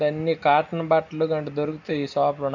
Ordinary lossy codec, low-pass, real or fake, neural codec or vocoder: none; 7.2 kHz; real; none